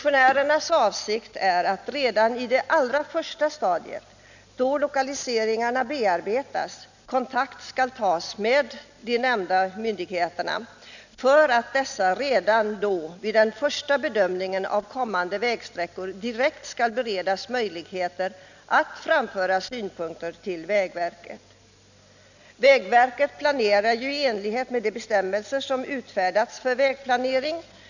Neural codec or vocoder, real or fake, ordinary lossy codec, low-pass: none; real; none; 7.2 kHz